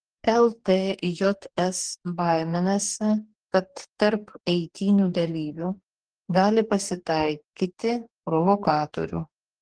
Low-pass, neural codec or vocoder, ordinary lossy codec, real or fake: 9.9 kHz; codec, 44.1 kHz, 2.6 kbps, DAC; Opus, 16 kbps; fake